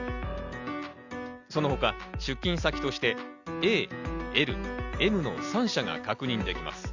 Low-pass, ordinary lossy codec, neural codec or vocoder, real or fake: 7.2 kHz; Opus, 64 kbps; none; real